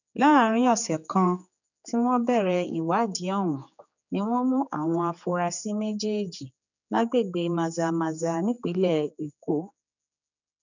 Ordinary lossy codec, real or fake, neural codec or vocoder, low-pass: none; fake; codec, 16 kHz, 4 kbps, X-Codec, HuBERT features, trained on general audio; 7.2 kHz